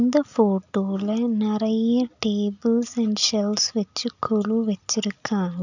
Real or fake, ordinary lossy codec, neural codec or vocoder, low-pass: real; none; none; 7.2 kHz